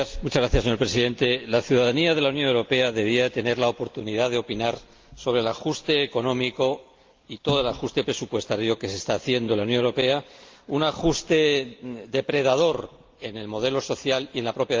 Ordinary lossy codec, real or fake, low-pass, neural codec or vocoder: Opus, 32 kbps; real; 7.2 kHz; none